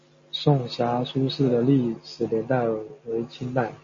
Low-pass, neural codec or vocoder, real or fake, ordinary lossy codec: 7.2 kHz; none; real; MP3, 32 kbps